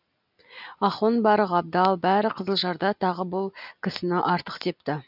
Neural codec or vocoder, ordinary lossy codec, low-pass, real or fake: none; none; 5.4 kHz; real